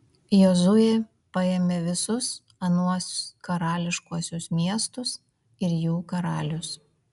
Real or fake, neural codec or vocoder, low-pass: real; none; 10.8 kHz